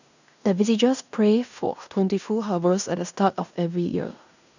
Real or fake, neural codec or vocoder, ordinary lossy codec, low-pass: fake; codec, 16 kHz in and 24 kHz out, 0.9 kbps, LongCat-Audio-Codec, fine tuned four codebook decoder; none; 7.2 kHz